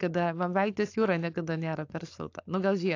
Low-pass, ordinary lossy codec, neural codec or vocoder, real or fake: 7.2 kHz; AAC, 48 kbps; codec, 16 kHz, 4.8 kbps, FACodec; fake